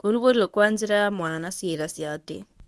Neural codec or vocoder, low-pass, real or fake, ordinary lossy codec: codec, 24 kHz, 0.9 kbps, WavTokenizer, medium speech release version 1; none; fake; none